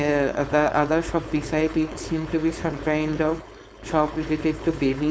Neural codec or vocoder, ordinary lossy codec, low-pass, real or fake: codec, 16 kHz, 4.8 kbps, FACodec; none; none; fake